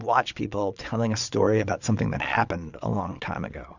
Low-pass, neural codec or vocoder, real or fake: 7.2 kHz; vocoder, 22.05 kHz, 80 mel bands, Vocos; fake